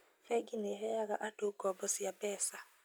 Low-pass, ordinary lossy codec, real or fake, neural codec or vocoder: none; none; real; none